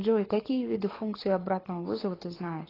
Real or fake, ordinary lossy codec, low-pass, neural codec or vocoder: fake; AAC, 24 kbps; 5.4 kHz; codec, 24 kHz, 6 kbps, HILCodec